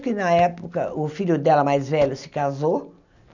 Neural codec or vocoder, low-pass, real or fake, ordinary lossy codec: none; 7.2 kHz; real; none